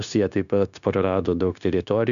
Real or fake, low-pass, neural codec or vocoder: fake; 7.2 kHz; codec, 16 kHz, 0.9 kbps, LongCat-Audio-Codec